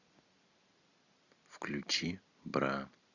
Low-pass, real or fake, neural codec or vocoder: 7.2 kHz; real; none